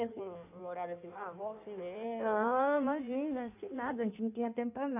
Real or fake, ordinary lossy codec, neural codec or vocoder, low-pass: fake; none; codec, 16 kHz in and 24 kHz out, 1.1 kbps, FireRedTTS-2 codec; 3.6 kHz